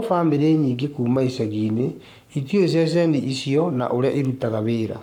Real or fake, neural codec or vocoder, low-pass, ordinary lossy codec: fake; codec, 44.1 kHz, 7.8 kbps, Pupu-Codec; 19.8 kHz; none